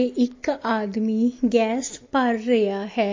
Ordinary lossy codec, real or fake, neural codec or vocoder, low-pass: MP3, 32 kbps; real; none; 7.2 kHz